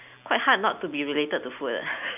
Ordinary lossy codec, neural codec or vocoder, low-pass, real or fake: none; none; 3.6 kHz; real